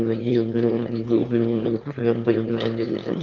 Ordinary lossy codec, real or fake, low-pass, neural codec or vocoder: Opus, 24 kbps; fake; 7.2 kHz; autoencoder, 22.05 kHz, a latent of 192 numbers a frame, VITS, trained on one speaker